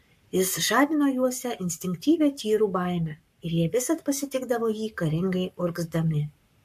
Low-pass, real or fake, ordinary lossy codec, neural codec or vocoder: 14.4 kHz; fake; MP3, 64 kbps; codec, 44.1 kHz, 7.8 kbps, Pupu-Codec